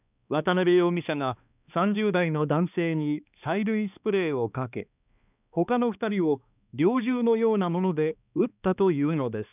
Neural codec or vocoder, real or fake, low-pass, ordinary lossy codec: codec, 16 kHz, 2 kbps, X-Codec, HuBERT features, trained on balanced general audio; fake; 3.6 kHz; none